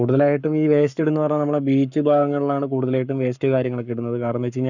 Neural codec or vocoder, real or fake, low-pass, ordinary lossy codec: codec, 44.1 kHz, 7.8 kbps, Pupu-Codec; fake; 7.2 kHz; none